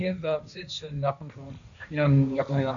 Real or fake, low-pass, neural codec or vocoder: fake; 7.2 kHz; codec, 16 kHz, 1.1 kbps, Voila-Tokenizer